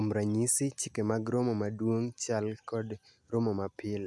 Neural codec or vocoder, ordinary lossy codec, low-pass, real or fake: none; none; none; real